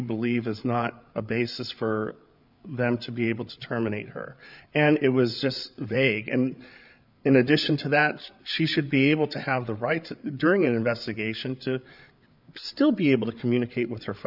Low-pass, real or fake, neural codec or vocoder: 5.4 kHz; fake; codec, 16 kHz, 8 kbps, FreqCodec, larger model